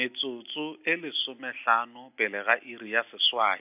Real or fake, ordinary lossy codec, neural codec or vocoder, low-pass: real; AAC, 32 kbps; none; 3.6 kHz